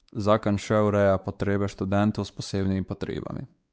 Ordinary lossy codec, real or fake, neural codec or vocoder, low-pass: none; fake; codec, 16 kHz, 4 kbps, X-Codec, WavLM features, trained on Multilingual LibriSpeech; none